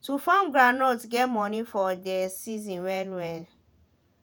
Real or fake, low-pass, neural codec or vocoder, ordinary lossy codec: fake; none; vocoder, 48 kHz, 128 mel bands, Vocos; none